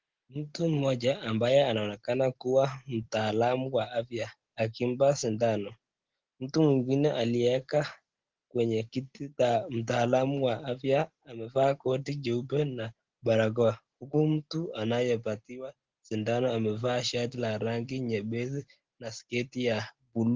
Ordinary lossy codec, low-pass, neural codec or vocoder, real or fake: Opus, 16 kbps; 7.2 kHz; none; real